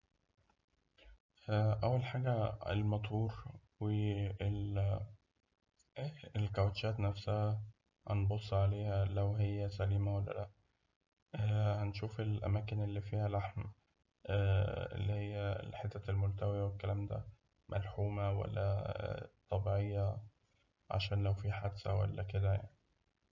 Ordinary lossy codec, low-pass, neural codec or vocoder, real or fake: none; 7.2 kHz; none; real